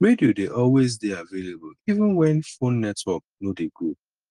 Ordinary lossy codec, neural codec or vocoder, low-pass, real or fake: Opus, 24 kbps; none; 10.8 kHz; real